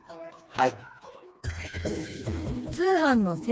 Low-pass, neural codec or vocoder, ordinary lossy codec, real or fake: none; codec, 16 kHz, 2 kbps, FreqCodec, smaller model; none; fake